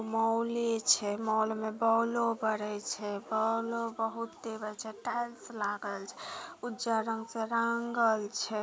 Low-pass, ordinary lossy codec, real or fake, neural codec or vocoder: none; none; real; none